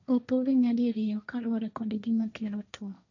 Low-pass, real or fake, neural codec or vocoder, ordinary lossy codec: 7.2 kHz; fake; codec, 16 kHz, 1.1 kbps, Voila-Tokenizer; none